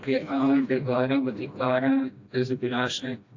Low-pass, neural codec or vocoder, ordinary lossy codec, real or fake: 7.2 kHz; codec, 16 kHz, 1 kbps, FreqCodec, smaller model; AAC, 48 kbps; fake